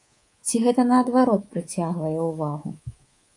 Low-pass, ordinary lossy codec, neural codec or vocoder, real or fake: 10.8 kHz; AAC, 48 kbps; codec, 24 kHz, 3.1 kbps, DualCodec; fake